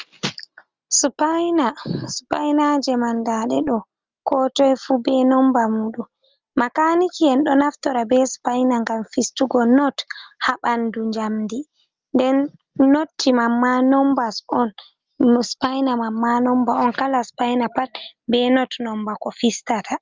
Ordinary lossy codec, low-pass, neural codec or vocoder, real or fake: Opus, 24 kbps; 7.2 kHz; none; real